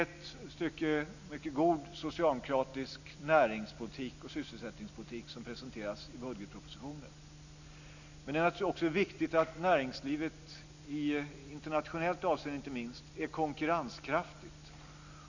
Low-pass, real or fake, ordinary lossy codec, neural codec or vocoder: 7.2 kHz; real; none; none